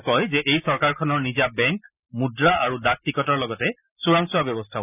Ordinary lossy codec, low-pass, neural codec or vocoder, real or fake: none; 3.6 kHz; none; real